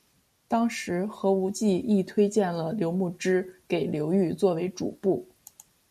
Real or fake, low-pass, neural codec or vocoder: real; 14.4 kHz; none